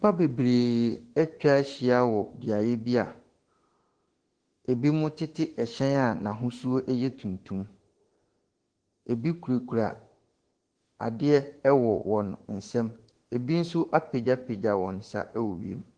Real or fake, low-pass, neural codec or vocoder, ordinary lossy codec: fake; 9.9 kHz; autoencoder, 48 kHz, 32 numbers a frame, DAC-VAE, trained on Japanese speech; Opus, 16 kbps